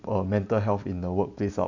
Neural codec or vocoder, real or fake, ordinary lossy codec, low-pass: none; real; none; 7.2 kHz